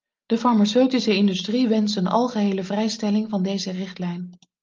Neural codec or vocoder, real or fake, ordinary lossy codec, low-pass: none; real; Opus, 24 kbps; 7.2 kHz